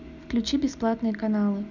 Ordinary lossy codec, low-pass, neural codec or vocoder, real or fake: none; 7.2 kHz; none; real